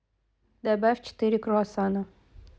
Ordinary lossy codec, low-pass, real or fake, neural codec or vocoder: none; none; real; none